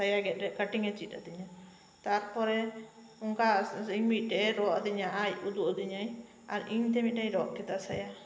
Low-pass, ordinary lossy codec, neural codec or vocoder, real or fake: none; none; none; real